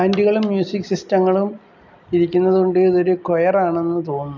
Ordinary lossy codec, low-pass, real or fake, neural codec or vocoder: none; 7.2 kHz; real; none